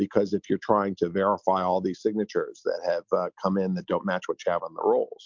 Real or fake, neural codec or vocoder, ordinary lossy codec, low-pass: real; none; MP3, 64 kbps; 7.2 kHz